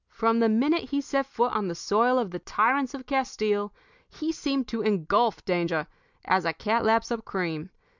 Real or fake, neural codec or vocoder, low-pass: real; none; 7.2 kHz